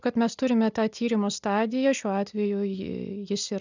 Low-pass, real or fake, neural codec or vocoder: 7.2 kHz; real; none